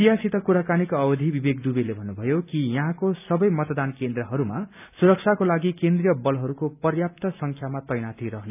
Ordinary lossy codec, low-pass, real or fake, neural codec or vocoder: none; 3.6 kHz; real; none